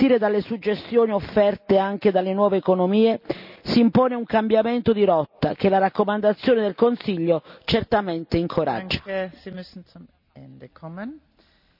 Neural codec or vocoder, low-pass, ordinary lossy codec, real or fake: none; 5.4 kHz; none; real